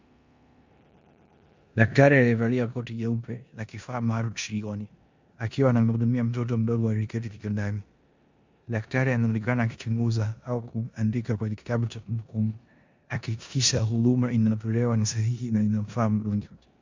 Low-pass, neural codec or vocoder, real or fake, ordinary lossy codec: 7.2 kHz; codec, 16 kHz in and 24 kHz out, 0.9 kbps, LongCat-Audio-Codec, four codebook decoder; fake; MP3, 64 kbps